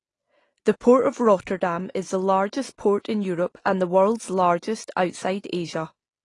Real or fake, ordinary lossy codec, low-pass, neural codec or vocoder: real; AAC, 32 kbps; 10.8 kHz; none